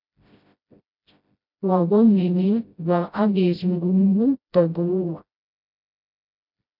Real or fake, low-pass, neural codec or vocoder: fake; 5.4 kHz; codec, 16 kHz, 0.5 kbps, FreqCodec, smaller model